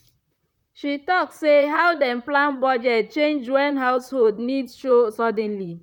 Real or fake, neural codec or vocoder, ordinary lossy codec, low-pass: fake; vocoder, 44.1 kHz, 128 mel bands, Pupu-Vocoder; none; 19.8 kHz